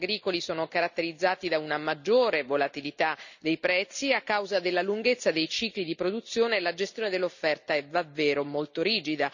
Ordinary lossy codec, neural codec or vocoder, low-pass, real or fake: none; none; 7.2 kHz; real